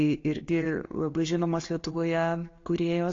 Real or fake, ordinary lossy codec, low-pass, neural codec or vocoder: real; AAC, 32 kbps; 7.2 kHz; none